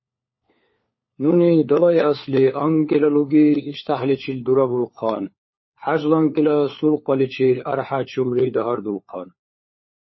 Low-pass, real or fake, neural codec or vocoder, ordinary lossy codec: 7.2 kHz; fake; codec, 16 kHz, 4 kbps, FunCodec, trained on LibriTTS, 50 frames a second; MP3, 24 kbps